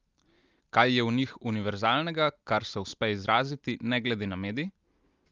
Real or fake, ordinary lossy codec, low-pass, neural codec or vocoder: real; Opus, 32 kbps; 7.2 kHz; none